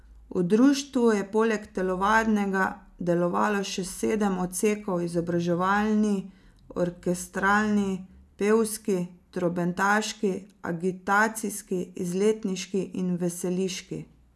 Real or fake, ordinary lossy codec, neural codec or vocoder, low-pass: real; none; none; none